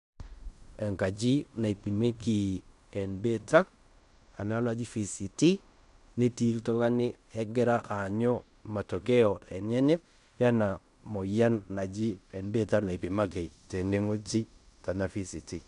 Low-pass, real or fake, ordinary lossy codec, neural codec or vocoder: 10.8 kHz; fake; none; codec, 16 kHz in and 24 kHz out, 0.9 kbps, LongCat-Audio-Codec, fine tuned four codebook decoder